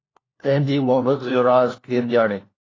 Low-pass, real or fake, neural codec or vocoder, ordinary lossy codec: 7.2 kHz; fake; codec, 16 kHz, 1 kbps, FunCodec, trained on LibriTTS, 50 frames a second; AAC, 32 kbps